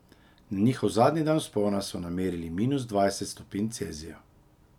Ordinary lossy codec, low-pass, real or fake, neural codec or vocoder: none; 19.8 kHz; real; none